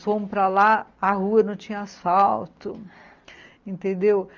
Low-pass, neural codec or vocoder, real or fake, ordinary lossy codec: 7.2 kHz; none; real; Opus, 24 kbps